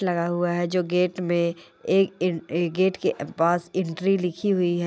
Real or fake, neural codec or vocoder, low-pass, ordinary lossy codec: real; none; none; none